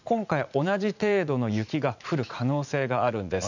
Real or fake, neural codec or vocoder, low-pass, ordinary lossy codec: fake; vocoder, 44.1 kHz, 80 mel bands, Vocos; 7.2 kHz; none